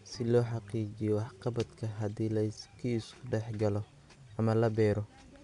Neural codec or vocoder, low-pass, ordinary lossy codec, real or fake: none; 10.8 kHz; none; real